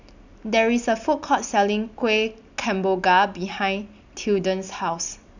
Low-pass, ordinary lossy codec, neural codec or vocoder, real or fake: 7.2 kHz; none; none; real